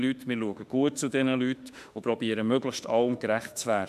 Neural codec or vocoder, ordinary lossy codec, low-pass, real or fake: autoencoder, 48 kHz, 32 numbers a frame, DAC-VAE, trained on Japanese speech; none; 14.4 kHz; fake